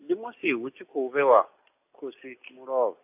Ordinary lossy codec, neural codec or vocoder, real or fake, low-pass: AAC, 24 kbps; none; real; 3.6 kHz